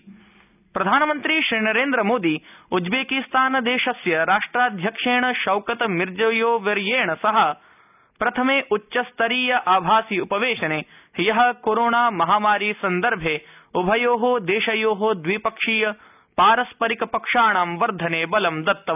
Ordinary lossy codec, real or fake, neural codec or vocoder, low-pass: none; real; none; 3.6 kHz